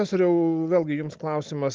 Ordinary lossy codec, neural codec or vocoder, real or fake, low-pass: Opus, 24 kbps; none; real; 7.2 kHz